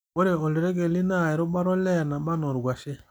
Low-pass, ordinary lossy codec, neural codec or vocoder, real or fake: none; none; none; real